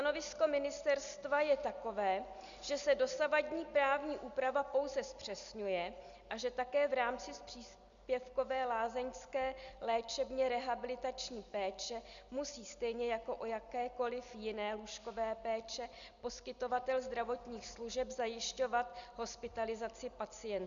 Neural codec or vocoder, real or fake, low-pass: none; real; 7.2 kHz